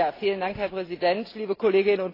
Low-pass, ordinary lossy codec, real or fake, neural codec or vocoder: 5.4 kHz; AAC, 24 kbps; real; none